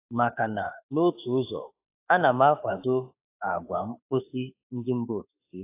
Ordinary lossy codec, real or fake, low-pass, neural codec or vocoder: AAC, 24 kbps; fake; 3.6 kHz; autoencoder, 48 kHz, 32 numbers a frame, DAC-VAE, trained on Japanese speech